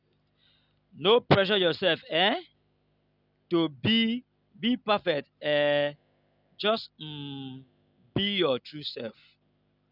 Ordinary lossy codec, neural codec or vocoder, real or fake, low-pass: none; none; real; 5.4 kHz